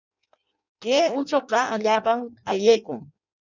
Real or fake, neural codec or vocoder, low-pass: fake; codec, 16 kHz in and 24 kHz out, 0.6 kbps, FireRedTTS-2 codec; 7.2 kHz